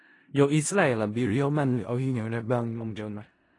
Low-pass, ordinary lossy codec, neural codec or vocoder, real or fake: 10.8 kHz; AAC, 32 kbps; codec, 16 kHz in and 24 kHz out, 0.4 kbps, LongCat-Audio-Codec, four codebook decoder; fake